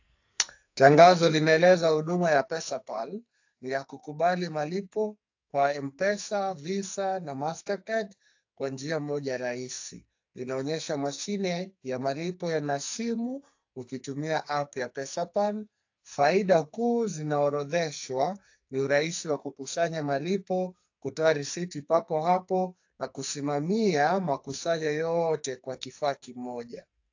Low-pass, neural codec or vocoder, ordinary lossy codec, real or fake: 7.2 kHz; codec, 44.1 kHz, 2.6 kbps, SNAC; AAC, 48 kbps; fake